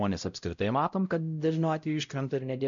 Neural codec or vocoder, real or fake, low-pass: codec, 16 kHz, 0.5 kbps, X-Codec, WavLM features, trained on Multilingual LibriSpeech; fake; 7.2 kHz